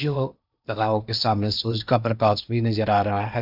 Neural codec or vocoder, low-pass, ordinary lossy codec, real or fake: codec, 16 kHz in and 24 kHz out, 0.8 kbps, FocalCodec, streaming, 65536 codes; 5.4 kHz; AAC, 48 kbps; fake